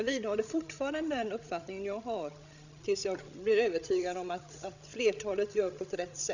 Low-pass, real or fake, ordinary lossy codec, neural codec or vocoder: 7.2 kHz; fake; none; codec, 16 kHz, 16 kbps, FreqCodec, larger model